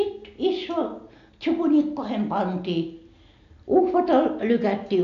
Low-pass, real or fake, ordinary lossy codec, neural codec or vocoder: 7.2 kHz; real; AAC, 48 kbps; none